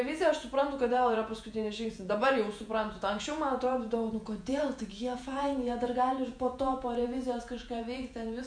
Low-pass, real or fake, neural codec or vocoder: 9.9 kHz; fake; vocoder, 48 kHz, 128 mel bands, Vocos